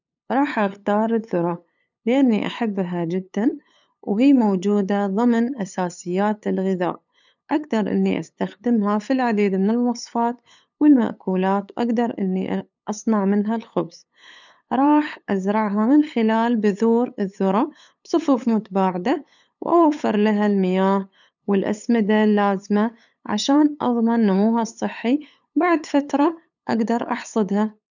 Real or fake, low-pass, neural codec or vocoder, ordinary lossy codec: fake; 7.2 kHz; codec, 16 kHz, 8 kbps, FunCodec, trained on LibriTTS, 25 frames a second; none